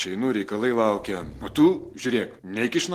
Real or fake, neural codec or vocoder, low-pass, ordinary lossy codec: real; none; 14.4 kHz; Opus, 16 kbps